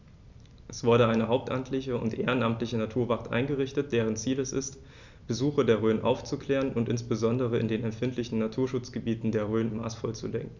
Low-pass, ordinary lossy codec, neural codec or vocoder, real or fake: 7.2 kHz; none; none; real